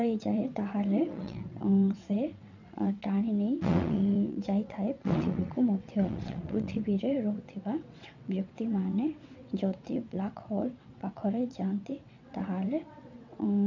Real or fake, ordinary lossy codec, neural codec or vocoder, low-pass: fake; none; vocoder, 22.05 kHz, 80 mel bands, WaveNeXt; 7.2 kHz